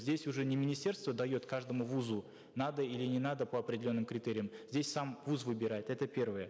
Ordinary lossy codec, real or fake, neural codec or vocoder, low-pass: none; real; none; none